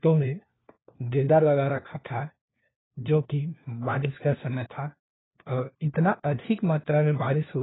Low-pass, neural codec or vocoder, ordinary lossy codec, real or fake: 7.2 kHz; codec, 16 kHz, 1 kbps, FunCodec, trained on LibriTTS, 50 frames a second; AAC, 16 kbps; fake